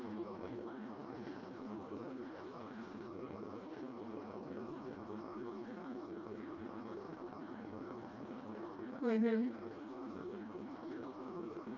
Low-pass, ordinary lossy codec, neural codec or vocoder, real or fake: 7.2 kHz; none; codec, 16 kHz, 1 kbps, FreqCodec, smaller model; fake